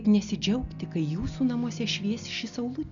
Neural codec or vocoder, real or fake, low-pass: none; real; 7.2 kHz